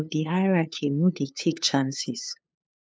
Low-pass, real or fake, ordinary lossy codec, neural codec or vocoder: none; fake; none; codec, 16 kHz, 2 kbps, FunCodec, trained on LibriTTS, 25 frames a second